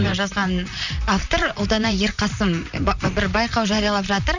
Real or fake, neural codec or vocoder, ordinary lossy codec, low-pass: fake; vocoder, 44.1 kHz, 128 mel bands, Pupu-Vocoder; none; 7.2 kHz